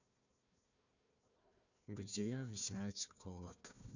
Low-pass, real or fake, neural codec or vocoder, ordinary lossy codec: 7.2 kHz; fake; codec, 16 kHz, 1 kbps, FunCodec, trained on Chinese and English, 50 frames a second; none